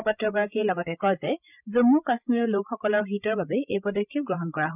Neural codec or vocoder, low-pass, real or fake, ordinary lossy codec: codec, 16 kHz, 16 kbps, FreqCodec, larger model; 3.6 kHz; fake; none